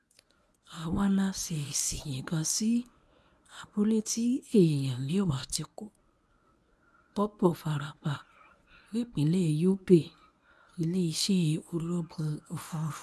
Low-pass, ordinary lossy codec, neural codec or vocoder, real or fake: none; none; codec, 24 kHz, 0.9 kbps, WavTokenizer, medium speech release version 1; fake